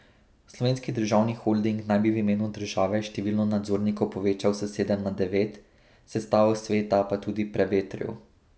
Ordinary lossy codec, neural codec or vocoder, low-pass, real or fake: none; none; none; real